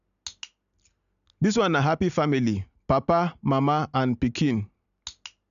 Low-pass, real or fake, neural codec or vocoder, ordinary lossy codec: 7.2 kHz; real; none; none